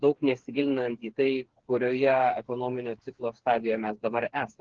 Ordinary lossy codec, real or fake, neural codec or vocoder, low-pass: Opus, 16 kbps; fake; codec, 16 kHz, 4 kbps, FreqCodec, smaller model; 7.2 kHz